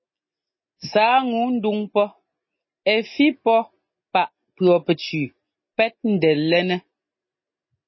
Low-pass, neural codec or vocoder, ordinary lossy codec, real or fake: 7.2 kHz; none; MP3, 24 kbps; real